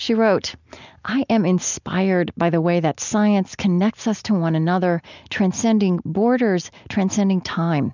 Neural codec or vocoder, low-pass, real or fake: none; 7.2 kHz; real